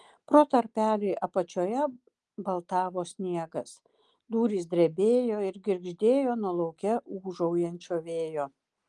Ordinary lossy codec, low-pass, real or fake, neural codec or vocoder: Opus, 24 kbps; 10.8 kHz; real; none